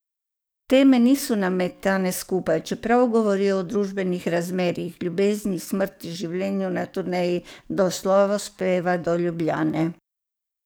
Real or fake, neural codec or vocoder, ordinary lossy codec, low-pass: fake; codec, 44.1 kHz, 7.8 kbps, DAC; none; none